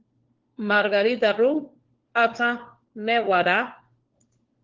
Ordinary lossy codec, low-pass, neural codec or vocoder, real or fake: Opus, 16 kbps; 7.2 kHz; codec, 16 kHz, 4 kbps, FunCodec, trained on LibriTTS, 50 frames a second; fake